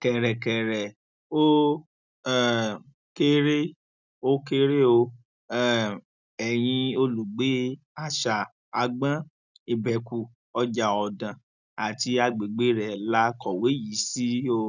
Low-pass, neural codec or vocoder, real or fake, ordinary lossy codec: 7.2 kHz; none; real; none